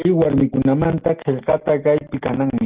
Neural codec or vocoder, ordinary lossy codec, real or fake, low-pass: none; Opus, 16 kbps; real; 3.6 kHz